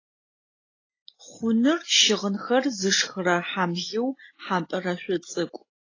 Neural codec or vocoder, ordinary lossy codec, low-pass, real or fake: none; AAC, 32 kbps; 7.2 kHz; real